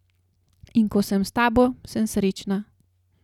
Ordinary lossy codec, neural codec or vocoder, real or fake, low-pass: none; none; real; 19.8 kHz